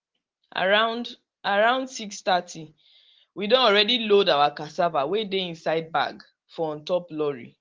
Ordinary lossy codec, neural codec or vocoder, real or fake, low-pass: Opus, 16 kbps; none; real; 7.2 kHz